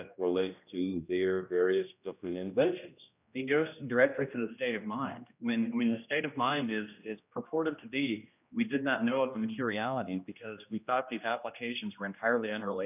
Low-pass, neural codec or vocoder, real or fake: 3.6 kHz; codec, 16 kHz, 1 kbps, X-Codec, HuBERT features, trained on general audio; fake